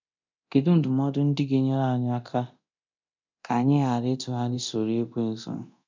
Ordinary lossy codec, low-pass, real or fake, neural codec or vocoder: MP3, 64 kbps; 7.2 kHz; fake; codec, 24 kHz, 0.9 kbps, DualCodec